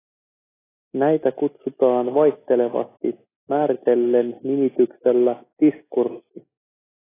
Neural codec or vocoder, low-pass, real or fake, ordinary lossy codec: none; 3.6 kHz; real; AAC, 16 kbps